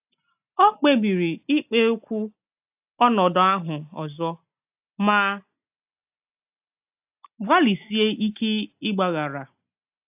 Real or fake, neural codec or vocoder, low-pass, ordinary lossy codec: real; none; 3.6 kHz; AAC, 32 kbps